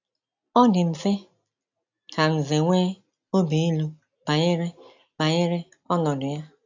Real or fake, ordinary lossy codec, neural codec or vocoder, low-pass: real; AAC, 48 kbps; none; 7.2 kHz